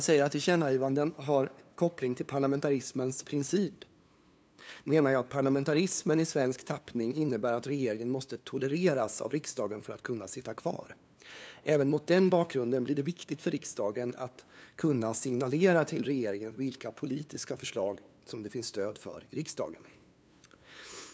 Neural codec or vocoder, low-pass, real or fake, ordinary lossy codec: codec, 16 kHz, 2 kbps, FunCodec, trained on LibriTTS, 25 frames a second; none; fake; none